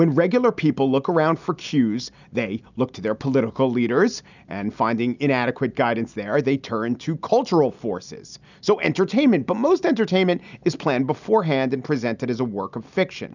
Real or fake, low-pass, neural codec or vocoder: real; 7.2 kHz; none